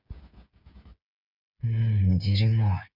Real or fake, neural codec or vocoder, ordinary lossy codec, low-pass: fake; codec, 16 kHz, 8 kbps, FreqCodec, smaller model; none; 5.4 kHz